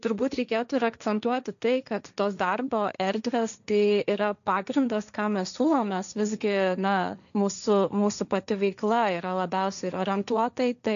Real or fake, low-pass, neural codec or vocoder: fake; 7.2 kHz; codec, 16 kHz, 1.1 kbps, Voila-Tokenizer